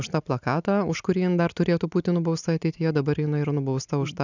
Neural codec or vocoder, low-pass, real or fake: none; 7.2 kHz; real